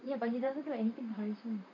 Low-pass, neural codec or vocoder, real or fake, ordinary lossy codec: 7.2 kHz; vocoder, 44.1 kHz, 128 mel bands, Pupu-Vocoder; fake; none